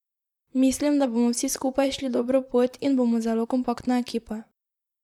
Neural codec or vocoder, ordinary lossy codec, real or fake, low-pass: vocoder, 44.1 kHz, 128 mel bands, Pupu-Vocoder; none; fake; 19.8 kHz